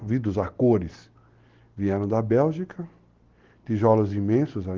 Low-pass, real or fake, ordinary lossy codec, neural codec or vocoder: 7.2 kHz; real; Opus, 16 kbps; none